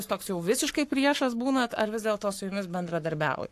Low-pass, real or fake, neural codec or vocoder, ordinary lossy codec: 14.4 kHz; fake; codec, 44.1 kHz, 7.8 kbps, Pupu-Codec; AAC, 64 kbps